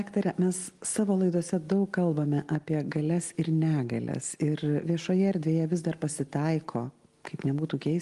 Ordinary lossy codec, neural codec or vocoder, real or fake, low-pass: Opus, 24 kbps; none; real; 10.8 kHz